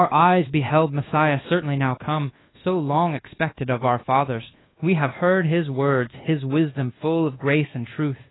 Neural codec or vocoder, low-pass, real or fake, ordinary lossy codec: codec, 24 kHz, 1.2 kbps, DualCodec; 7.2 kHz; fake; AAC, 16 kbps